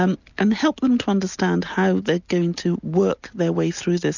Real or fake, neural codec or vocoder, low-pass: real; none; 7.2 kHz